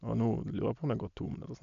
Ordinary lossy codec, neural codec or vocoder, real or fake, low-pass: none; none; real; 7.2 kHz